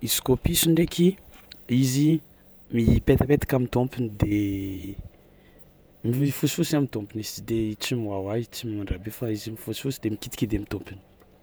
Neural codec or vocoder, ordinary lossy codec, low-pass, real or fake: vocoder, 48 kHz, 128 mel bands, Vocos; none; none; fake